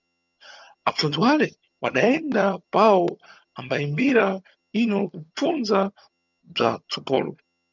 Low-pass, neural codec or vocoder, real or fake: 7.2 kHz; vocoder, 22.05 kHz, 80 mel bands, HiFi-GAN; fake